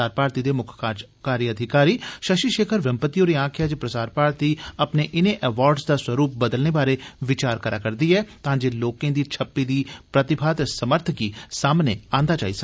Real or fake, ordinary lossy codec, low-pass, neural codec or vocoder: real; none; none; none